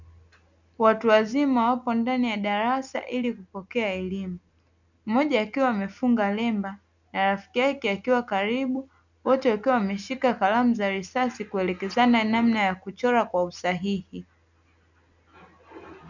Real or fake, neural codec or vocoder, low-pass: real; none; 7.2 kHz